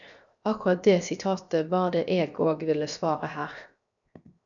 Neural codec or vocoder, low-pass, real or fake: codec, 16 kHz, 0.7 kbps, FocalCodec; 7.2 kHz; fake